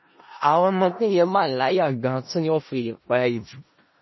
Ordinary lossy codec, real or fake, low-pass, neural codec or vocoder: MP3, 24 kbps; fake; 7.2 kHz; codec, 16 kHz in and 24 kHz out, 0.4 kbps, LongCat-Audio-Codec, four codebook decoder